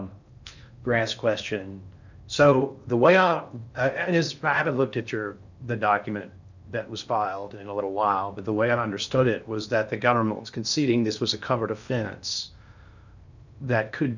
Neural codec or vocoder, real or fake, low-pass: codec, 16 kHz in and 24 kHz out, 0.6 kbps, FocalCodec, streaming, 4096 codes; fake; 7.2 kHz